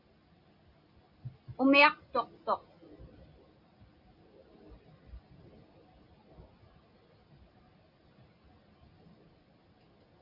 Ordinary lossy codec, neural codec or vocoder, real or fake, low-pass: Opus, 64 kbps; none; real; 5.4 kHz